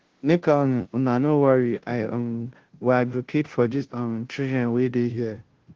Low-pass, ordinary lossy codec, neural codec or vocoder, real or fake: 7.2 kHz; Opus, 16 kbps; codec, 16 kHz, 0.5 kbps, FunCodec, trained on Chinese and English, 25 frames a second; fake